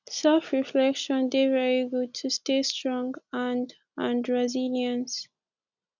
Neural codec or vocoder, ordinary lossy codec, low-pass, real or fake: none; none; 7.2 kHz; real